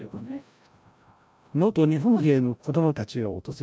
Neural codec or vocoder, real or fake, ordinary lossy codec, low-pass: codec, 16 kHz, 0.5 kbps, FreqCodec, larger model; fake; none; none